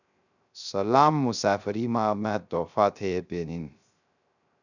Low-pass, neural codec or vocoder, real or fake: 7.2 kHz; codec, 16 kHz, 0.3 kbps, FocalCodec; fake